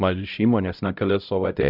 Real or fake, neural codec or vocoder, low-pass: fake; codec, 16 kHz, 0.5 kbps, X-Codec, HuBERT features, trained on LibriSpeech; 5.4 kHz